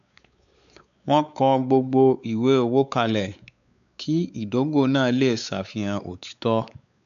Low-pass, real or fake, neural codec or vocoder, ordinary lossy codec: 7.2 kHz; fake; codec, 16 kHz, 4 kbps, X-Codec, WavLM features, trained on Multilingual LibriSpeech; none